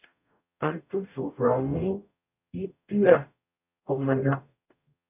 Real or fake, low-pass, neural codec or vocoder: fake; 3.6 kHz; codec, 44.1 kHz, 0.9 kbps, DAC